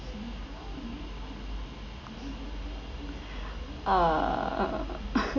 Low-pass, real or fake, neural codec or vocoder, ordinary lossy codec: 7.2 kHz; real; none; none